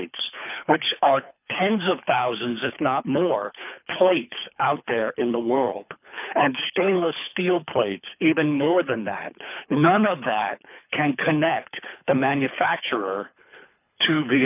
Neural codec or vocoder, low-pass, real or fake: codec, 24 kHz, 3 kbps, HILCodec; 3.6 kHz; fake